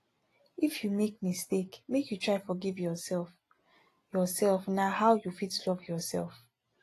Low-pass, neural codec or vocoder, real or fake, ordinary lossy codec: 14.4 kHz; none; real; AAC, 48 kbps